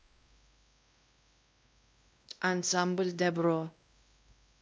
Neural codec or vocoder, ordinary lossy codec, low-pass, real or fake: codec, 16 kHz, 1 kbps, X-Codec, WavLM features, trained on Multilingual LibriSpeech; none; none; fake